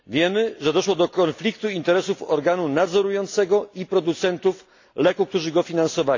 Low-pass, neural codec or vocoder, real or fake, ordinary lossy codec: 7.2 kHz; none; real; AAC, 48 kbps